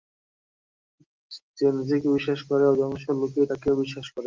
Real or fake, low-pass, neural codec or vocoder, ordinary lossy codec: real; 7.2 kHz; none; Opus, 24 kbps